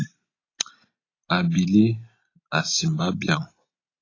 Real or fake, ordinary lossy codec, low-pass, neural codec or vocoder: real; AAC, 32 kbps; 7.2 kHz; none